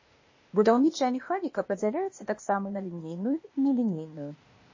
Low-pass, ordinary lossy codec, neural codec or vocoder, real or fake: 7.2 kHz; MP3, 32 kbps; codec, 16 kHz, 0.8 kbps, ZipCodec; fake